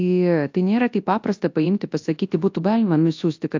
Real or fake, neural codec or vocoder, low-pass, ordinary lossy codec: fake; codec, 24 kHz, 0.9 kbps, WavTokenizer, large speech release; 7.2 kHz; AAC, 48 kbps